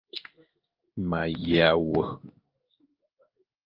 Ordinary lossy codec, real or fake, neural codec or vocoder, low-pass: Opus, 32 kbps; fake; codec, 16 kHz in and 24 kHz out, 1 kbps, XY-Tokenizer; 5.4 kHz